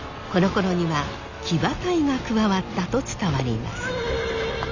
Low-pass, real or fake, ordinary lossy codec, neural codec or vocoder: 7.2 kHz; real; none; none